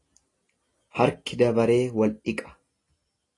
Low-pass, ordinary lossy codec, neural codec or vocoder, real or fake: 10.8 kHz; AAC, 32 kbps; none; real